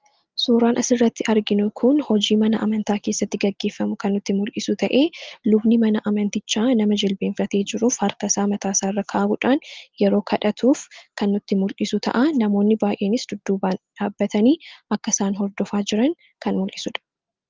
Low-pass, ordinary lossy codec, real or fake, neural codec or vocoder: 7.2 kHz; Opus, 24 kbps; real; none